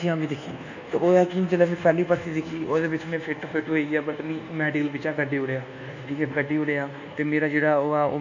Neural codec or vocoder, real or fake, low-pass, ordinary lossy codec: codec, 24 kHz, 1.2 kbps, DualCodec; fake; 7.2 kHz; none